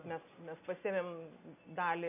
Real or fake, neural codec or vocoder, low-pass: real; none; 3.6 kHz